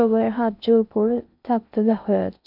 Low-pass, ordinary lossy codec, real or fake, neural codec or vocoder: 5.4 kHz; none; fake; codec, 16 kHz in and 24 kHz out, 0.6 kbps, FocalCodec, streaming, 2048 codes